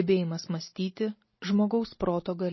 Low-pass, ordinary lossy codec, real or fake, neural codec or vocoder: 7.2 kHz; MP3, 24 kbps; real; none